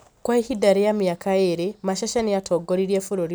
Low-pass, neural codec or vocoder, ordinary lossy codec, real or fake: none; none; none; real